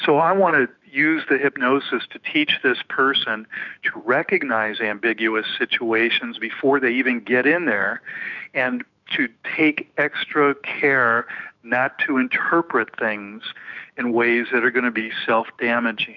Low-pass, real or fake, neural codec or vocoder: 7.2 kHz; fake; autoencoder, 48 kHz, 128 numbers a frame, DAC-VAE, trained on Japanese speech